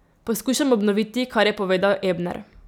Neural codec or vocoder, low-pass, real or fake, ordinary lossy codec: none; 19.8 kHz; real; none